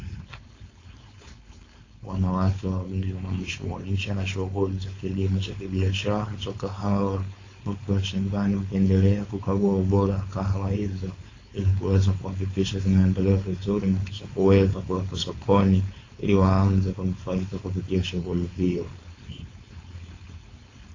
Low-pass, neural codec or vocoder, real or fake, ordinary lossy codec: 7.2 kHz; codec, 16 kHz, 4.8 kbps, FACodec; fake; AAC, 32 kbps